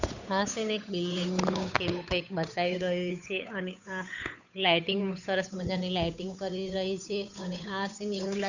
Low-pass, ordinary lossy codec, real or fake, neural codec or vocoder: 7.2 kHz; none; fake; codec, 16 kHz, 8 kbps, FunCodec, trained on Chinese and English, 25 frames a second